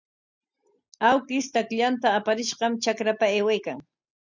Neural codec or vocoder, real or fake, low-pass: none; real; 7.2 kHz